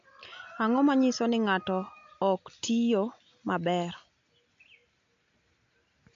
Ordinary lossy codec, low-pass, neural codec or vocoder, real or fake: AAC, 48 kbps; 7.2 kHz; none; real